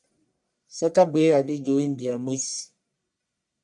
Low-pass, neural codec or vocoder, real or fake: 10.8 kHz; codec, 44.1 kHz, 1.7 kbps, Pupu-Codec; fake